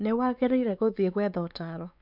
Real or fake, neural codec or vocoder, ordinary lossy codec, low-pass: fake; codec, 16 kHz, 4 kbps, X-Codec, WavLM features, trained on Multilingual LibriSpeech; none; 5.4 kHz